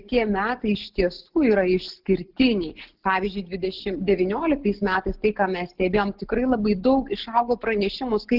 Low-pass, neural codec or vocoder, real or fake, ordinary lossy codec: 5.4 kHz; none; real; Opus, 16 kbps